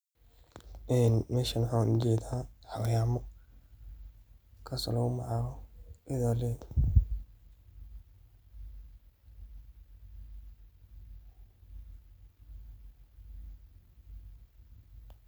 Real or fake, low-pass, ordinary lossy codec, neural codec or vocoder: real; none; none; none